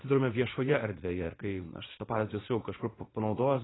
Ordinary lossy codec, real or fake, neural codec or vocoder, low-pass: AAC, 16 kbps; fake; vocoder, 44.1 kHz, 80 mel bands, Vocos; 7.2 kHz